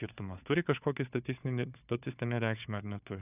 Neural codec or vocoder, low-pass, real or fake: codec, 16 kHz, 4 kbps, FunCodec, trained on Chinese and English, 50 frames a second; 3.6 kHz; fake